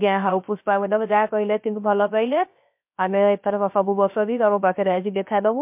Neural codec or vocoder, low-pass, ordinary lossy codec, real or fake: codec, 16 kHz, 0.3 kbps, FocalCodec; 3.6 kHz; MP3, 32 kbps; fake